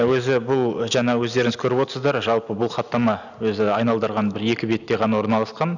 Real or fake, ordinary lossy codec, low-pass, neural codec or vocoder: real; none; 7.2 kHz; none